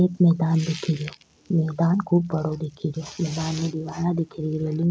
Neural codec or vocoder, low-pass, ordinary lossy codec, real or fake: none; none; none; real